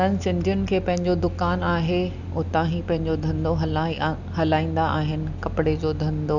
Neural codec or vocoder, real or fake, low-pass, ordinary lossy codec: none; real; 7.2 kHz; none